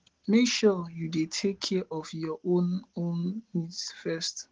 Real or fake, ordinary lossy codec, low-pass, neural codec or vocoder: real; Opus, 16 kbps; 7.2 kHz; none